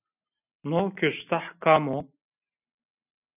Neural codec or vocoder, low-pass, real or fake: none; 3.6 kHz; real